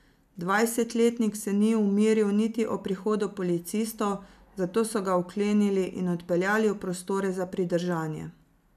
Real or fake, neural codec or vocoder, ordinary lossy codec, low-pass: real; none; none; 14.4 kHz